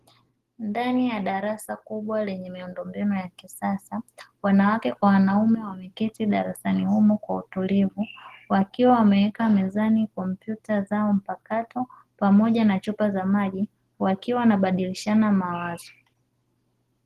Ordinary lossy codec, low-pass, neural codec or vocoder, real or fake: Opus, 16 kbps; 14.4 kHz; none; real